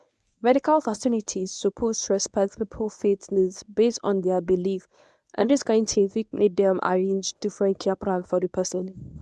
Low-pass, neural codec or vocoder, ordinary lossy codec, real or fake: none; codec, 24 kHz, 0.9 kbps, WavTokenizer, medium speech release version 1; none; fake